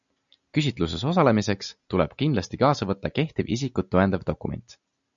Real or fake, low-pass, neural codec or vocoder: real; 7.2 kHz; none